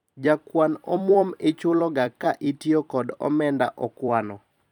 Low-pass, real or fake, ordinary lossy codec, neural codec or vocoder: 19.8 kHz; fake; none; vocoder, 44.1 kHz, 128 mel bands every 256 samples, BigVGAN v2